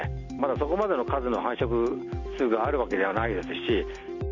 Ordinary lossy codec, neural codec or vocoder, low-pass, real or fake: none; none; 7.2 kHz; real